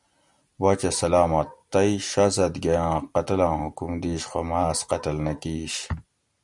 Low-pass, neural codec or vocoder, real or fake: 10.8 kHz; none; real